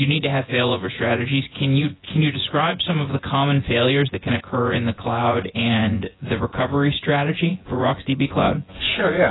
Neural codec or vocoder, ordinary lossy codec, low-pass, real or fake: vocoder, 24 kHz, 100 mel bands, Vocos; AAC, 16 kbps; 7.2 kHz; fake